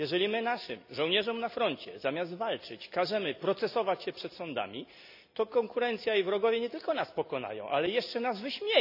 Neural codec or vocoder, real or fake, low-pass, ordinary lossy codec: none; real; 5.4 kHz; none